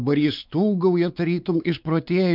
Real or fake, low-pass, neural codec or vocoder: fake; 5.4 kHz; codec, 16 kHz, 4 kbps, X-Codec, WavLM features, trained on Multilingual LibriSpeech